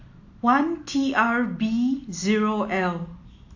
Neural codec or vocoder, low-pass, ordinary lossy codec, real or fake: none; 7.2 kHz; AAC, 48 kbps; real